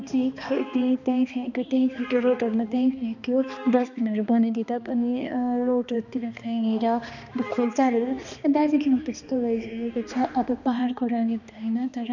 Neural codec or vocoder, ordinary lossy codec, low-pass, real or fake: codec, 16 kHz, 2 kbps, X-Codec, HuBERT features, trained on balanced general audio; none; 7.2 kHz; fake